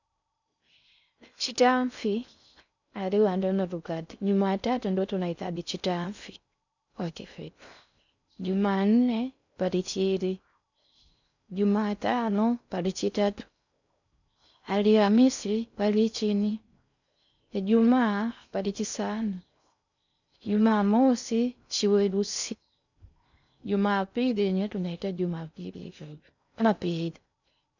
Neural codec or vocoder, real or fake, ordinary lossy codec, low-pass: codec, 16 kHz in and 24 kHz out, 0.6 kbps, FocalCodec, streaming, 4096 codes; fake; none; 7.2 kHz